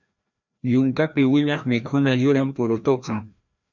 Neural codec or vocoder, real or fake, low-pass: codec, 16 kHz, 1 kbps, FreqCodec, larger model; fake; 7.2 kHz